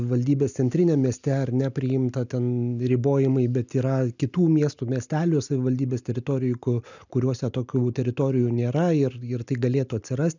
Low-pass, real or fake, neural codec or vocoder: 7.2 kHz; real; none